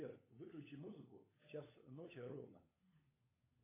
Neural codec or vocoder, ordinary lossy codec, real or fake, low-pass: codec, 16 kHz, 16 kbps, FunCodec, trained on LibriTTS, 50 frames a second; AAC, 16 kbps; fake; 3.6 kHz